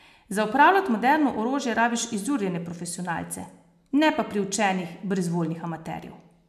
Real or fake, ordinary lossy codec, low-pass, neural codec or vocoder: real; MP3, 96 kbps; 14.4 kHz; none